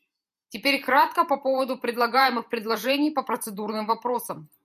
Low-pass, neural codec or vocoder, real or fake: 14.4 kHz; vocoder, 48 kHz, 128 mel bands, Vocos; fake